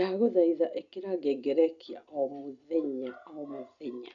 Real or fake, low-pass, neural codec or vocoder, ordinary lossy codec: real; 7.2 kHz; none; none